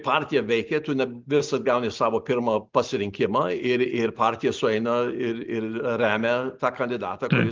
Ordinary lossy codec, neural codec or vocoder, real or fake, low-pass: Opus, 32 kbps; none; real; 7.2 kHz